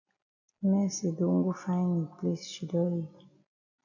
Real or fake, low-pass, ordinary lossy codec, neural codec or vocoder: real; 7.2 kHz; AAC, 48 kbps; none